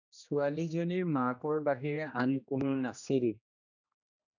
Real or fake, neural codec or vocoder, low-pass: fake; codec, 16 kHz, 1 kbps, X-Codec, HuBERT features, trained on general audio; 7.2 kHz